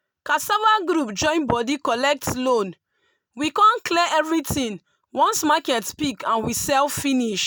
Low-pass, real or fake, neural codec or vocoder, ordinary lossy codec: none; real; none; none